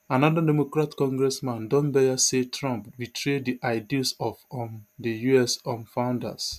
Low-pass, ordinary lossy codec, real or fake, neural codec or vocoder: 14.4 kHz; none; real; none